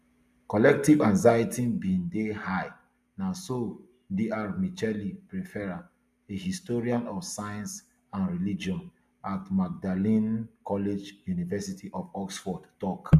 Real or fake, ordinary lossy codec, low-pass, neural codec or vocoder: real; MP3, 96 kbps; 14.4 kHz; none